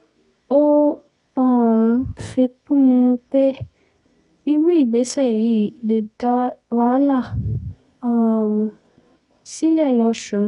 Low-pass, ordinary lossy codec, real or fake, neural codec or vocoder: 10.8 kHz; none; fake; codec, 24 kHz, 0.9 kbps, WavTokenizer, medium music audio release